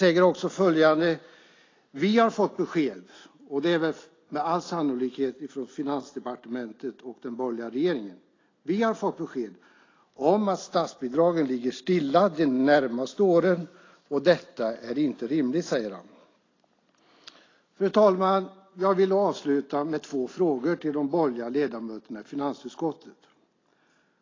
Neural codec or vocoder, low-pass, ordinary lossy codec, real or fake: none; 7.2 kHz; AAC, 32 kbps; real